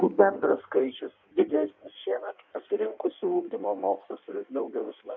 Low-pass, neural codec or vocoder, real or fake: 7.2 kHz; codec, 16 kHz in and 24 kHz out, 1.1 kbps, FireRedTTS-2 codec; fake